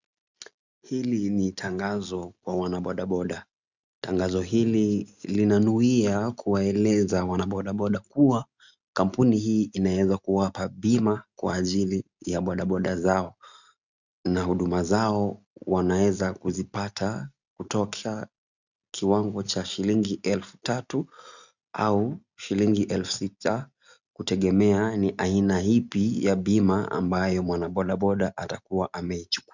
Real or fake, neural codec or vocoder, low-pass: real; none; 7.2 kHz